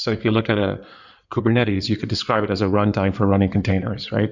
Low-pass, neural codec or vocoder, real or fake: 7.2 kHz; codec, 16 kHz in and 24 kHz out, 2.2 kbps, FireRedTTS-2 codec; fake